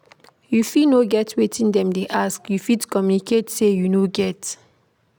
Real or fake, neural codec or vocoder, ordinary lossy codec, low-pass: real; none; none; none